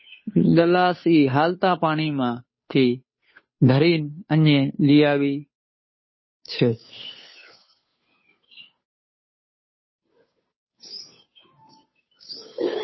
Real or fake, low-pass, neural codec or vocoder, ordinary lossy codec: fake; 7.2 kHz; codec, 16 kHz, 2 kbps, FunCodec, trained on Chinese and English, 25 frames a second; MP3, 24 kbps